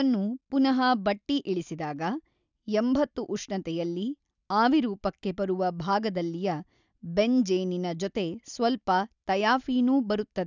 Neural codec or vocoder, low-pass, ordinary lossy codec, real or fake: none; 7.2 kHz; none; real